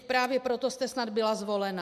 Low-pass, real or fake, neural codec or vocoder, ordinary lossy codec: 14.4 kHz; real; none; MP3, 96 kbps